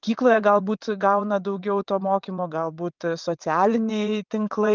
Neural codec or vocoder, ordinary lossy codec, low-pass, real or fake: vocoder, 22.05 kHz, 80 mel bands, WaveNeXt; Opus, 24 kbps; 7.2 kHz; fake